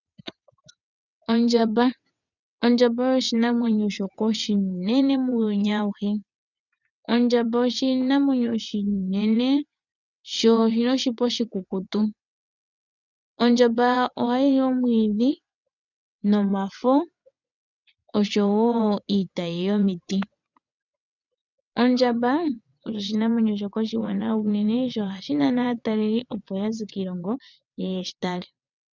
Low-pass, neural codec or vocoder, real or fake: 7.2 kHz; vocoder, 22.05 kHz, 80 mel bands, WaveNeXt; fake